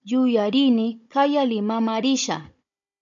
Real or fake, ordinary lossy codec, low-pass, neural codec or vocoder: fake; MP3, 64 kbps; 7.2 kHz; codec, 16 kHz, 16 kbps, FunCodec, trained on Chinese and English, 50 frames a second